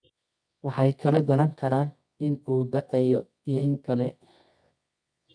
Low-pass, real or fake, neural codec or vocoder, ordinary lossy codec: 9.9 kHz; fake; codec, 24 kHz, 0.9 kbps, WavTokenizer, medium music audio release; none